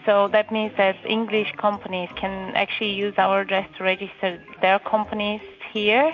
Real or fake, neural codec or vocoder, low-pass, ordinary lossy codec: real; none; 7.2 kHz; MP3, 48 kbps